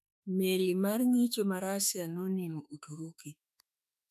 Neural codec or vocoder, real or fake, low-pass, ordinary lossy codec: autoencoder, 48 kHz, 32 numbers a frame, DAC-VAE, trained on Japanese speech; fake; 14.4 kHz; none